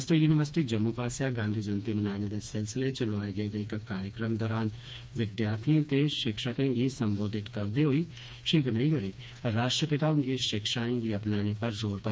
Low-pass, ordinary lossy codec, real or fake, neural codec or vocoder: none; none; fake; codec, 16 kHz, 2 kbps, FreqCodec, smaller model